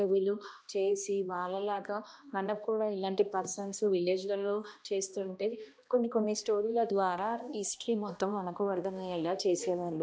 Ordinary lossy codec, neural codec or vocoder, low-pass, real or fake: none; codec, 16 kHz, 1 kbps, X-Codec, HuBERT features, trained on balanced general audio; none; fake